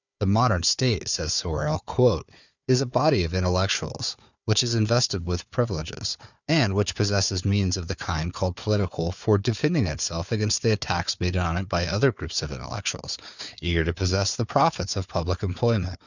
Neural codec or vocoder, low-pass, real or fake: codec, 16 kHz, 4 kbps, FunCodec, trained on Chinese and English, 50 frames a second; 7.2 kHz; fake